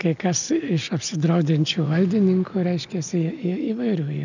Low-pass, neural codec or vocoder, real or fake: 7.2 kHz; none; real